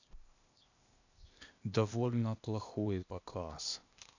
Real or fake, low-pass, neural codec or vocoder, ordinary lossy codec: fake; 7.2 kHz; codec, 16 kHz, 0.8 kbps, ZipCodec; none